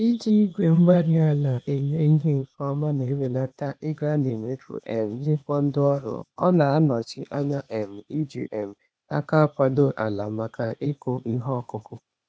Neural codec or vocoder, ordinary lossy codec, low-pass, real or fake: codec, 16 kHz, 0.8 kbps, ZipCodec; none; none; fake